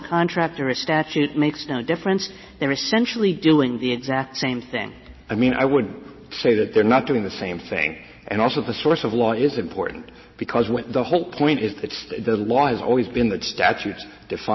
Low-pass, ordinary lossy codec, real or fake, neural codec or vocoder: 7.2 kHz; MP3, 24 kbps; real; none